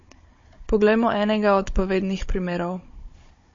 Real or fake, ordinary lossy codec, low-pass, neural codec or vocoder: fake; MP3, 32 kbps; 7.2 kHz; codec, 16 kHz, 16 kbps, FunCodec, trained on Chinese and English, 50 frames a second